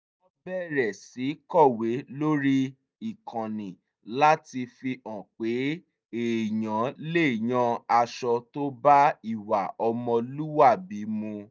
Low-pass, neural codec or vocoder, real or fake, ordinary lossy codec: none; none; real; none